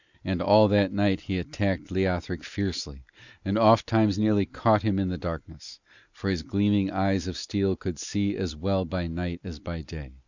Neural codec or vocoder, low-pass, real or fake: none; 7.2 kHz; real